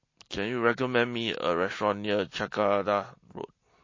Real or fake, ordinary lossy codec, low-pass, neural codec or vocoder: real; MP3, 32 kbps; 7.2 kHz; none